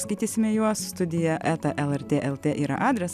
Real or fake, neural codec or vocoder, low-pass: real; none; 14.4 kHz